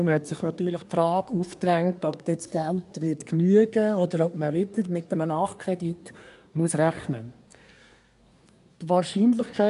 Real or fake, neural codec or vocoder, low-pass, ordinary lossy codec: fake; codec, 24 kHz, 1 kbps, SNAC; 10.8 kHz; none